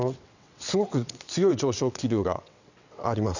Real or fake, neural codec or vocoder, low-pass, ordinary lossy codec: fake; vocoder, 22.05 kHz, 80 mel bands, WaveNeXt; 7.2 kHz; none